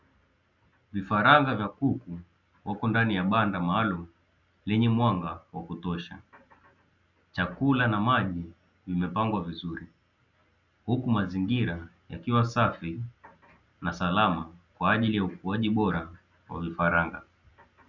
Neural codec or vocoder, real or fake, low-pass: none; real; 7.2 kHz